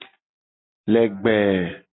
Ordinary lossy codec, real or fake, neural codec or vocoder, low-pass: AAC, 16 kbps; real; none; 7.2 kHz